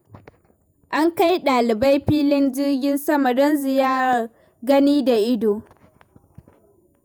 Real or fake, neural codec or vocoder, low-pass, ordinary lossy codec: fake; vocoder, 48 kHz, 128 mel bands, Vocos; none; none